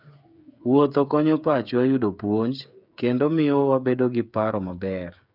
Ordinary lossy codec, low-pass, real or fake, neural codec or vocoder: none; 5.4 kHz; fake; codec, 16 kHz, 8 kbps, FreqCodec, smaller model